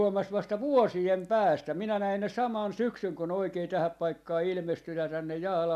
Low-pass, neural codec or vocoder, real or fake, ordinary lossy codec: 14.4 kHz; none; real; none